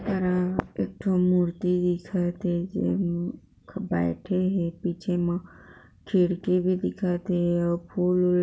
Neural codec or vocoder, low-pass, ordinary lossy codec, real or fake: none; none; none; real